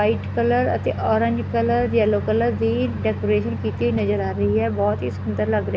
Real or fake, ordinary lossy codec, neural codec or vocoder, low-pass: real; none; none; none